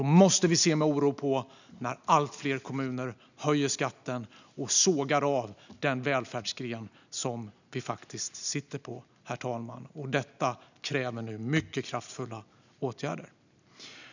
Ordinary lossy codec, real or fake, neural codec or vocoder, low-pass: none; real; none; 7.2 kHz